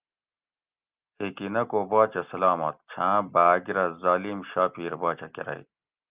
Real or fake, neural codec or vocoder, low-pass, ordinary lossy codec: real; none; 3.6 kHz; Opus, 24 kbps